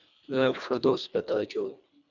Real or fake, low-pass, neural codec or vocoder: fake; 7.2 kHz; codec, 24 kHz, 1.5 kbps, HILCodec